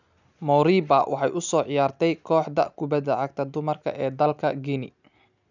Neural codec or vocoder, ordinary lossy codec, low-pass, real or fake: none; none; 7.2 kHz; real